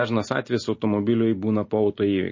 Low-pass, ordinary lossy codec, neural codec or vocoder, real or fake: 7.2 kHz; MP3, 32 kbps; none; real